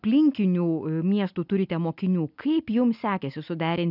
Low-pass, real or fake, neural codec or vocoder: 5.4 kHz; real; none